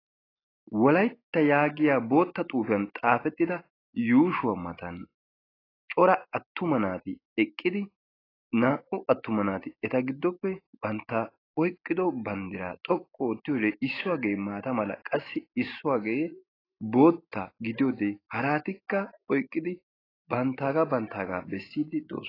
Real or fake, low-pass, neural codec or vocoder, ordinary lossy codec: real; 5.4 kHz; none; AAC, 24 kbps